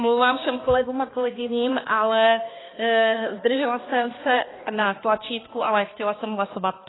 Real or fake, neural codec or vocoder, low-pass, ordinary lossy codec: fake; codec, 16 kHz, 2 kbps, X-Codec, HuBERT features, trained on balanced general audio; 7.2 kHz; AAC, 16 kbps